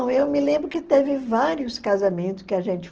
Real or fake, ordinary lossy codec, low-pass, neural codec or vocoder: real; Opus, 16 kbps; 7.2 kHz; none